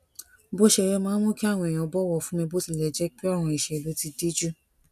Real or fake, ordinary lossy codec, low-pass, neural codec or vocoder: real; none; 14.4 kHz; none